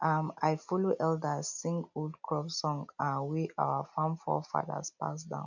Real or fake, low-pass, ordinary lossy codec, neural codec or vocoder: real; 7.2 kHz; none; none